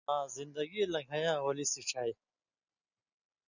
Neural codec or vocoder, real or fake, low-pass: none; real; 7.2 kHz